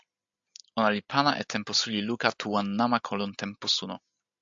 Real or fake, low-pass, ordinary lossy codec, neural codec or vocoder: real; 7.2 kHz; AAC, 64 kbps; none